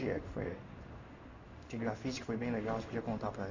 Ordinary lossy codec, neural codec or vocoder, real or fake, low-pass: AAC, 48 kbps; codec, 16 kHz in and 24 kHz out, 1 kbps, XY-Tokenizer; fake; 7.2 kHz